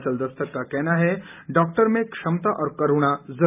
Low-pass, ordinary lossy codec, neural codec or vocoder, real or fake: 3.6 kHz; none; none; real